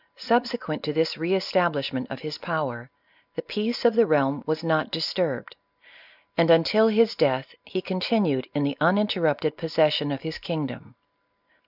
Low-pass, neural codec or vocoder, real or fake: 5.4 kHz; none; real